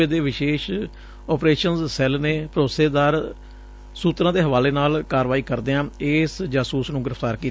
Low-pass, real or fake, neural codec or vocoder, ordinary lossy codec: none; real; none; none